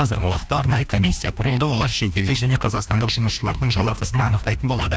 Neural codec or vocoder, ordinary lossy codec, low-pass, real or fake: codec, 16 kHz, 1 kbps, FreqCodec, larger model; none; none; fake